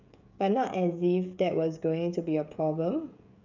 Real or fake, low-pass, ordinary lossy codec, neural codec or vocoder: fake; 7.2 kHz; none; codec, 16 kHz, 16 kbps, FreqCodec, smaller model